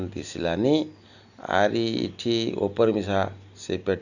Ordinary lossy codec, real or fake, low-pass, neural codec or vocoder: none; real; 7.2 kHz; none